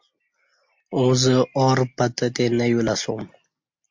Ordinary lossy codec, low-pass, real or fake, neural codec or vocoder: MP3, 48 kbps; 7.2 kHz; real; none